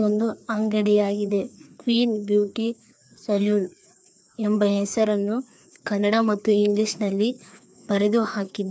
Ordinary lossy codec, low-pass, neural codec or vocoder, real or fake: none; none; codec, 16 kHz, 2 kbps, FreqCodec, larger model; fake